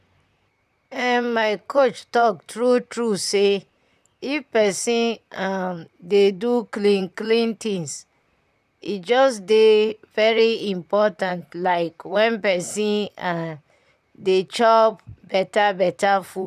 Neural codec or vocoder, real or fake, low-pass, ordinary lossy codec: vocoder, 44.1 kHz, 128 mel bands, Pupu-Vocoder; fake; 14.4 kHz; none